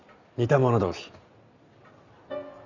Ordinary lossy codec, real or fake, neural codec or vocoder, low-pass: none; real; none; 7.2 kHz